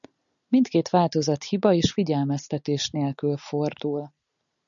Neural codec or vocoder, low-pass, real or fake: none; 7.2 kHz; real